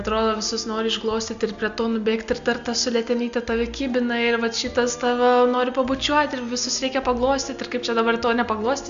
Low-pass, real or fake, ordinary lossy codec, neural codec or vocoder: 7.2 kHz; real; MP3, 64 kbps; none